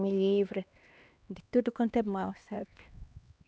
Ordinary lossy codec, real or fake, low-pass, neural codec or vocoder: none; fake; none; codec, 16 kHz, 2 kbps, X-Codec, HuBERT features, trained on LibriSpeech